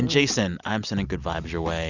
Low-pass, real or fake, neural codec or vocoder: 7.2 kHz; real; none